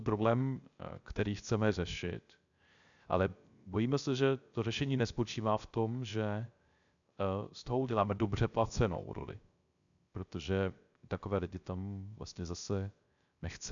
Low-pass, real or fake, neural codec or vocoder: 7.2 kHz; fake; codec, 16 kHz, 0.3 kbps, FocalCodec